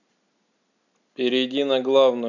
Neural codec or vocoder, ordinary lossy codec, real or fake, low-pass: none; none; real; 7.2 kHz